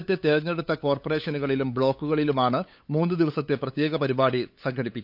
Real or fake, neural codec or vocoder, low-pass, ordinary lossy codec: fake; codec, 16 kHz, 8 kbps, FunCodec, trained on LibriTTS, 25 frames a second; 5.4 kHz; none